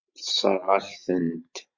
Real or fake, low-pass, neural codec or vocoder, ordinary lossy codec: fake; 7.2 kHz; vocoder, 44.1 kHz, 128 mel bands every 512 samples, BigVGAN v2; MP3, 48 kbps